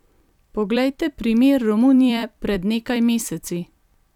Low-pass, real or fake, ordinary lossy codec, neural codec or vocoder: 19.8 kHz; fake; none; vocoder, 44.1 kHz, 128 mel bands every 256 samples, BigVGAN v2